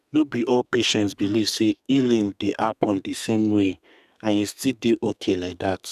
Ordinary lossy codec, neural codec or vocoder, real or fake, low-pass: none; codec, 32 kHz, 1.9 kbps, SNAC; fake; 14.4 kHz